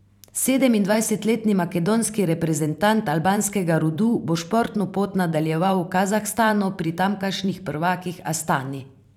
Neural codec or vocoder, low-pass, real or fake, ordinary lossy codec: vocoder, 44.1 kHz, 128 mel bands every 512 samples, BigVGAN v2; 19.8 kHz; fake; none